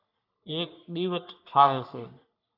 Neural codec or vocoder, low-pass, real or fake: codec, 44.1 kHz, 3.4 kbps, Pupu-Codec; 5.4 kHz; fake